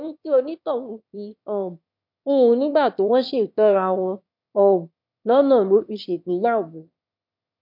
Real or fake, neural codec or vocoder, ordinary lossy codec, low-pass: fake; autoencoder, 22.05 kHz, a latent of 192 numbers a frame, VITS, trained on one speaker; MP3, 48 kbps; 5.4 kHz